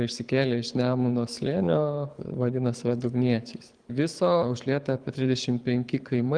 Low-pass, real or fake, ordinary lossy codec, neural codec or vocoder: 9.9 kHz; fake; Opus, 24 kbps; codec, 24 kHz, 6 kbps, HILCodec